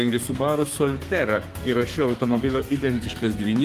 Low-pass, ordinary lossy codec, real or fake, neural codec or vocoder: 14.4 kHz; Opus, 24 kbps; fake; codec, 32 kHz, 1.9 kbps, SNAC